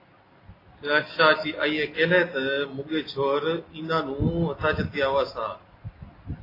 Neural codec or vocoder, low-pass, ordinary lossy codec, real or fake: none; 5.4 kHz; AAC, 24 kbps; real